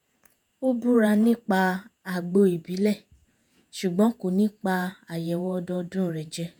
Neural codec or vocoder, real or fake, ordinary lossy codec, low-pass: vocoder, 48 kHz, 128 mel bands, Vocos; fake; none; none